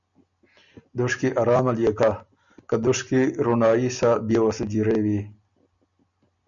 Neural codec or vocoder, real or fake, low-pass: none; real; 7.2 kHz